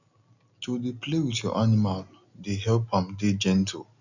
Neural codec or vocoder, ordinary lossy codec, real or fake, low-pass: none; none; real; 7.2 kHz